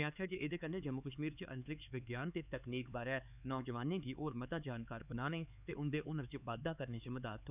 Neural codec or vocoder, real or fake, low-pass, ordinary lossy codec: codec, 16 kHz, 4 kbps, X-Codec, HuBERT features, trained on LibriSpeech; fake; 3.6 kHz; none